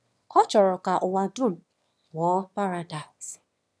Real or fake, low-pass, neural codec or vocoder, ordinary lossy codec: fake; none; autoencoder, 22.05 kHz, a latent of 192 numbers a frame, VITS, trained on one speaker; none